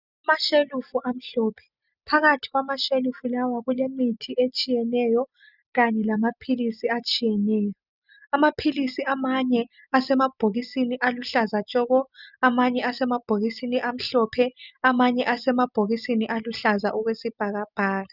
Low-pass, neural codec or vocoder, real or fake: 5.4 kHz; none; real